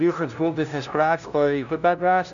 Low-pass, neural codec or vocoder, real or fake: 7.2 kHz; codec, 16 kHz, 0.5 kbps, FunCodec, trained on LibriTTS, 25 frames a second; fake